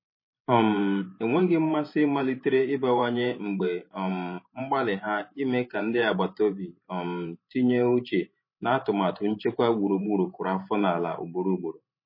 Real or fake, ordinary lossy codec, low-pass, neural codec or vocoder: fake; MP3, 24 kbps; 5.4 kHz; vocoder, 44.1 kHz, 128 mel bands every 512 samples, BigVGAN v2